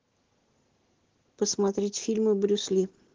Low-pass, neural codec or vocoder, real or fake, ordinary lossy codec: 7.2 kHz; none; real; Opus, 16 kbps